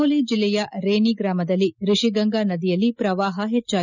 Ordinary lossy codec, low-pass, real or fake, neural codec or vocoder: none; 7.2 kHz; real; none